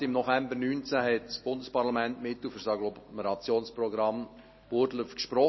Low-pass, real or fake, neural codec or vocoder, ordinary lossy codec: 7.2 kHz; real; none; MP3, 24 kbps